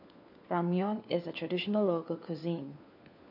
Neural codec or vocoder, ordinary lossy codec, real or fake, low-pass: codec, 16 kHz, 4 kbps, FunCodec, trained on LibriTTS, 50 frames a second; none; fake; 5.4 kHz